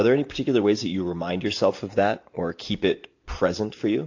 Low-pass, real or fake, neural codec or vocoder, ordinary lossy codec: 7.2 kHz; real; none; AAC, 48 kbps